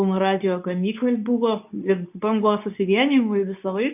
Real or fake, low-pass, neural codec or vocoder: fake; 3.6 kHz; codec, 24 kHz, 0.9 kbps, WavTokenizer, medium speech release version 2